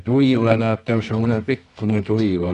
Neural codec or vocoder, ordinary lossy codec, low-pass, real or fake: codec, 24 kHz, 0.9 kbps, WavTokenizer, medium music audio release; none; 10.8 kHz; fake